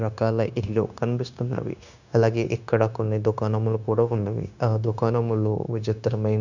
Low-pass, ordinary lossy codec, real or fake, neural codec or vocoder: 7.2 kHz; none; fake; codec, 16 kHz, 0.9 kbps, LongCat-Audio-Codec